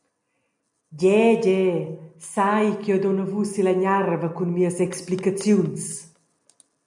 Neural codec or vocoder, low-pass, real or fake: none; 10.8 kHz; real